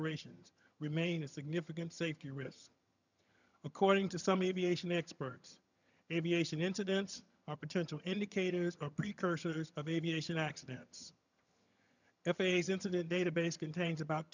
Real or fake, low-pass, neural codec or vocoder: fake; 7.2 kHz; vocoder, 22.05 kHz, 80 mel bands, HiFi-GAN